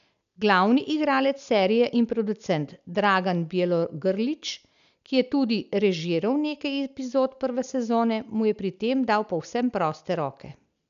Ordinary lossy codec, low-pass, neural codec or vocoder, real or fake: none; 7.2 kHz; none; real